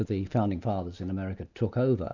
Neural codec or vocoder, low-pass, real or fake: none; 7.2 kHz; real